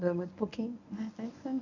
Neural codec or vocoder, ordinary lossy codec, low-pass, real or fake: codec, 24 kHz, 0.9 kbps, WavTokenizer, medium speech release version 1; none; 7.2 kHz; fake